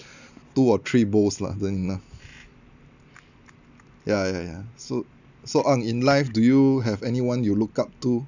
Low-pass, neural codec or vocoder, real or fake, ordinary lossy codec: 7.2 kHz; none; real; none